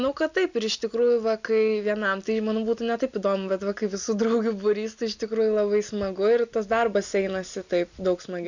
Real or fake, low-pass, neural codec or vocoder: real; 7.2 kHz; none